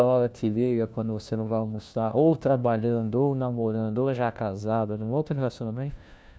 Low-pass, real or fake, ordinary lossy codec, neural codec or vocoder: none; fake; none; codec, 16 kHz, 1 kbps, FunCodec, trained on LibriTTS, 50 frames a second